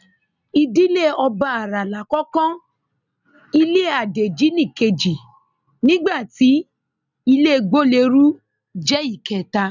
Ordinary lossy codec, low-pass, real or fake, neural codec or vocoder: none; 7.2 kHz; real; none